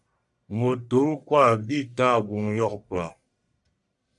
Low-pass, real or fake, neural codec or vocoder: 10.8 kHz; fake; codec, 44.1 kHz, 1.7 kbps, Pupu-Codec